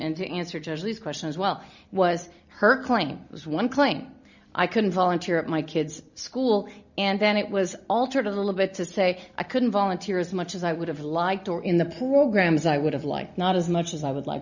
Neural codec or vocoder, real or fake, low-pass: none; real; 7.2 kHz